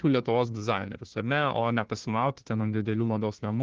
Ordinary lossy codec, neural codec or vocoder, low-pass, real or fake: Opus, 16 kbps; codec, 16 kHz, 1 kbps, FunCodec, trained on Chinese and English, 50 frames a second; 7.2 kHz; fake